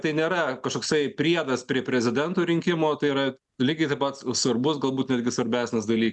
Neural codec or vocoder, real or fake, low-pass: none; real; 10.8 kHz